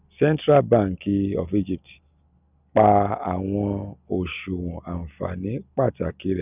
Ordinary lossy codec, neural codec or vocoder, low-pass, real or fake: none; none; 3.6 kHz; real